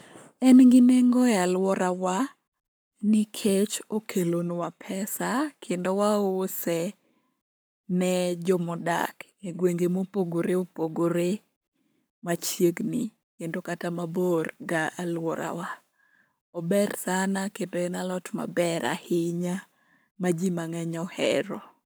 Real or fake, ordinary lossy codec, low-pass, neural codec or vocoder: fake; none; none; codec, 44.1 kHz, 7.8 kbps, Pupu-Codec